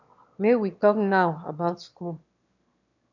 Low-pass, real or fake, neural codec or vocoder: 7.2 kHz; fake; autoencoder, 22.05 kHz, a latent of 192 numbers a frame, VITS, trained on one speaker